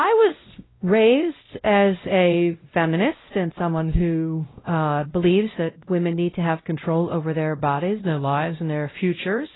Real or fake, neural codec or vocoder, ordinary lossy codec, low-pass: fake; codec, 16 kHz, 0.5 kbps, X-Codec, WavLM features, trained on Multilingual LibriSpeech; AAC, 16 kbps; 7.2 kHz